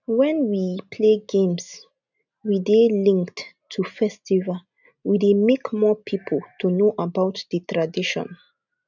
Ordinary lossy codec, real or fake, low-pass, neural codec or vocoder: none; real; 7.2 kHz; none